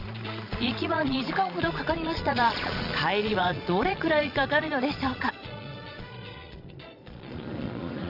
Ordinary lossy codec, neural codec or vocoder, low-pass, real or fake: none; vocoder, 22.05 kHz, 80 mel bands, Vocos; 5.4 kHz; fake